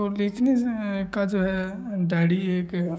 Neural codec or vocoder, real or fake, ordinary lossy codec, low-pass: codec, 16 kHz, 6 kbps, DAC; fake; none; none